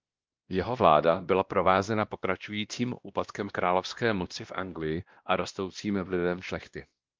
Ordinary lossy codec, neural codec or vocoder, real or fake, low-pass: Opus, 32 kbps; codec, 16 kHz, 1 kbps, X-Codec, WavLM features, trained on Multilingual LibriSpeech; fake; 7.2 kHz